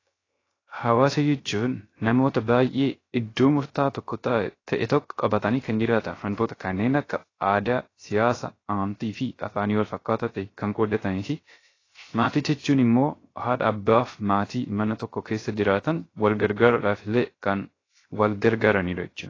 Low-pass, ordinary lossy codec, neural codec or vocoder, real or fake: 7.2 kHz; AAC, 32 kbps; codec, 16 kHz, 0.3 kbps, FocalCodec; fake